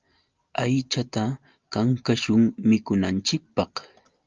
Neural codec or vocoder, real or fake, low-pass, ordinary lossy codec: none; real; 7.2 kHz; Opus, 24 kbps